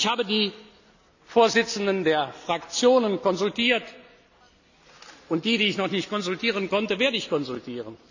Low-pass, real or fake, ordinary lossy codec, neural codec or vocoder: 7.2 kHz; real; none; none